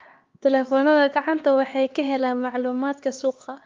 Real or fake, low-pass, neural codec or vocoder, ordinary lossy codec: fake; 7.2 kHz; codec, 16 kHz, 2 kbps, X-Codec, HuBERT features, trained on LibriSpeech; Opus, 32 kbps